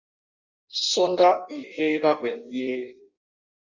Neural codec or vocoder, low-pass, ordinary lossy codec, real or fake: codec, 16 kHz in and 24 kHz out, 0.6 kbps, FireRedTTS-2 codec; 7.2 kHz; Opus, 64 kbps; fake